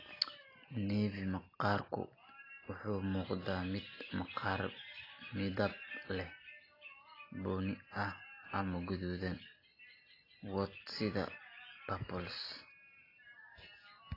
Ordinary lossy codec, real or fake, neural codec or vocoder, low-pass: AAC, 24 kbps; real; none; 5.4 kHz